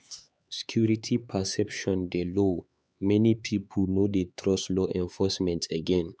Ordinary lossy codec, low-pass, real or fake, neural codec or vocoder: none; none; fake; codec, 16 kHz, 4 kbps, X-Codec, HuBERT features, trained on LibriSpeech